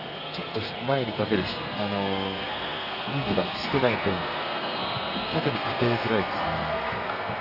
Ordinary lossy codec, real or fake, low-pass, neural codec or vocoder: none; fake; 5.4 kHz; codec, 32 kHz, 1.9 kbps, SNAC